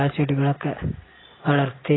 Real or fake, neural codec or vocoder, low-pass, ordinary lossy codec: real; none; 7.2 kHz; AAC, 16 kbps